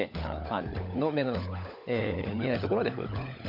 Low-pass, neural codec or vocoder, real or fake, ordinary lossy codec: 5.4 kHz; codec, 16 kHz, 16 kbps, FunCodec, trained on LibriTTS, 50 frames a second; fake; none